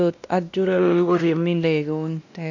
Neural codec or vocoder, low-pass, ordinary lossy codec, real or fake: codec, 16 kHz, 1 kbps, X-Codec, WavLM features, trained on Multilingual LibriSpeech; 7.2 kHz; none; fake